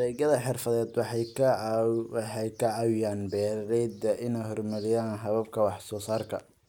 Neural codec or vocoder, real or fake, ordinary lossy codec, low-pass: none; real; none; 19.8 kHz